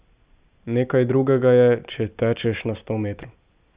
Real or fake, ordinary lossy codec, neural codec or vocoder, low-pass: real; Opus, 64 kbps; none; 3.6 kHz